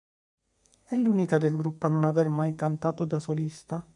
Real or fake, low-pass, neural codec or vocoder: fake; 10.8 kHz; codec, 32 kHz, 1.9 kbps, SNAC